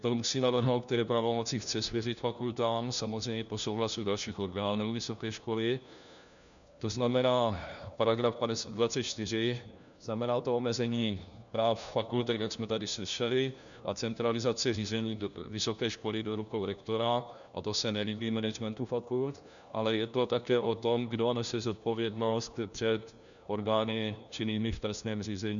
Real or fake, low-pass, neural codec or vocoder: fake; 7.2 kHz; codec, 16 kHz, 1 kbps, FunCodec, trained on LibriTTS, 50 frames a second